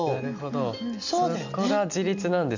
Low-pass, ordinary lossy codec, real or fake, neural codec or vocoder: 7.2 kHz; none; real; none